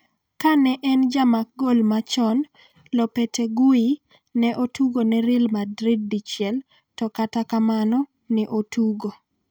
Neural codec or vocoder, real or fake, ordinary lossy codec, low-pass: none; real; none; none